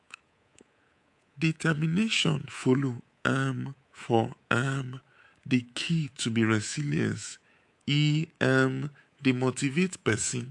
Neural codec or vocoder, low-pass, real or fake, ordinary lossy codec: codec, 24 kHz, 3.1 kbps, DualCodec; 10.8 kHz; fake; AAC, 64 kbps